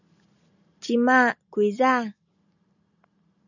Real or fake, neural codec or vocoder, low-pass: real; none; 7.2 kHz